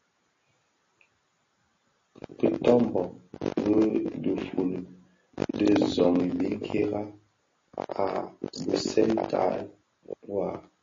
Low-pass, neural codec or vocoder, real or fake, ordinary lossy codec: 7.2 kHz; none; real; MP3, 32 kbps